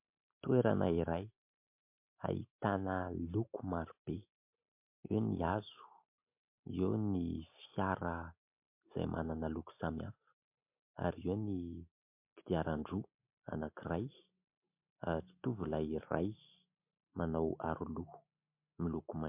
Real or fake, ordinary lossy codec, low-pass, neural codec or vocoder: real; MP3, 32 kbps; 3.6 kHz; none